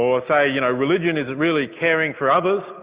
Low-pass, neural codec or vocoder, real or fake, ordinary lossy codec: 3.6 kHz; none; real; Opus, 64 kbps